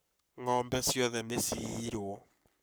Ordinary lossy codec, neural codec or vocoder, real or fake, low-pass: none; codec, 44.1 kHz, 7.8 kbps, Pupu-Codec; fake; none